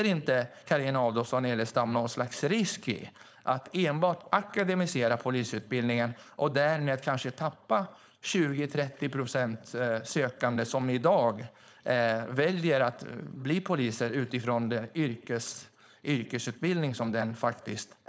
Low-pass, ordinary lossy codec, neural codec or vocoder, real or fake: none; none; codec, 16 kHz, 4.8 kbps, FACodec; fake